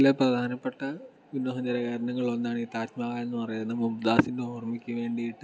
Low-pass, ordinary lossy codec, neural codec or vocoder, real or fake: none; none; none; real